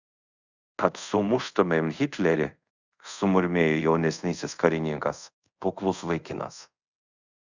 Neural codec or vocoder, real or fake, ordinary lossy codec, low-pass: codec, 24 kHz, 0.5 kbps, DualCodec; fake; Opus, 64 kbps; 7.2 kHz